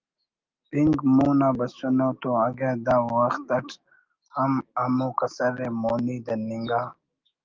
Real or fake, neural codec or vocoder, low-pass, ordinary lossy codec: fake; autoencoder, 48 kHz, 128 numbers a frame, DAC-VAE, trained on Japanese speech; 7.2 kHz; Opus, 32 kbps